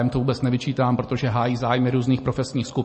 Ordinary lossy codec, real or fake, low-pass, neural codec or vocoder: MP3, 32 kbps; real; 10.8 kHz; none